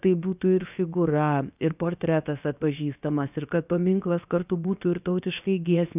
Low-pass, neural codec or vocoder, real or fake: 3.6 kHz; codec, 16 kHz, 0.7 kbps, FocalCodec; fake